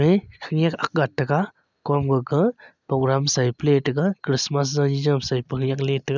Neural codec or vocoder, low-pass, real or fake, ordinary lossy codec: none; 7.2 kHz; real; none